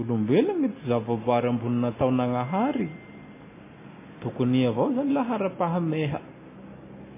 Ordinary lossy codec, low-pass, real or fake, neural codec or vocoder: MP3, 16 kbps; 3.6 kHz; real; none